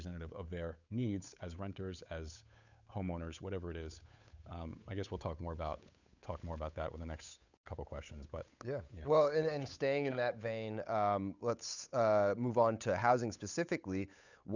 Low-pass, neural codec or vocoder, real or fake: 7.2 kHz; codec, 16 kHz, 8 kbps, FunCodec, trained on Chinese and English, 25 frames a second; fake